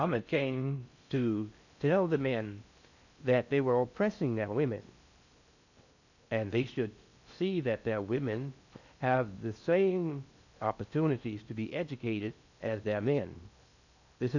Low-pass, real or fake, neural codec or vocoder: 7.2 kHz; fake; codec, 16 kHz in and 24 kHz out, 0.6 kbps, FocalCodec, streaming, 4096 codes